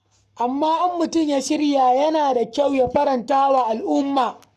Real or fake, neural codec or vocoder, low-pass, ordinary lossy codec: fake; codec, 44.1 kHz, 7.8 kbps, Pupu-Codec; 14.4 kHz; none